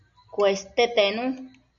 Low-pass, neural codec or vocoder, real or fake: 7.2 kHz; none; real